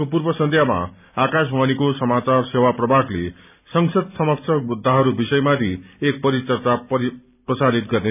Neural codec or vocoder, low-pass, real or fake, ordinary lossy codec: none; 3.6 kHz; real; none